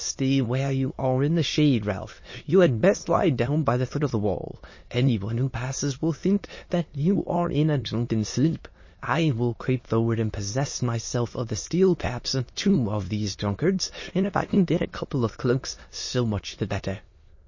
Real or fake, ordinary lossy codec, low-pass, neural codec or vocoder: fake; MP3, 32 kbps; 7.2 kHz; autoencoder, 22.05 kHz, a latent of 192 numbers a frame, VITS, trained on many speakers